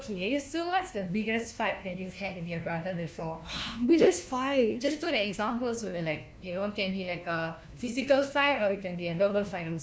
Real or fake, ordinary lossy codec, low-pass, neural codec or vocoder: fake; none; none; codec, 16 kHz, 1 kbps, FunCodec, trained on LibriTTS, 50 frames a second